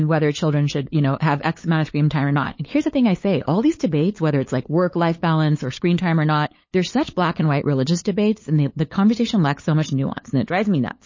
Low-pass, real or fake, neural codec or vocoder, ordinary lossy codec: 7.2 kHz; fake; codec, 16 kHz, 8 kbps, FunCodec, trained on LibriTTS, 25 frames a second; MP3, 32 kbps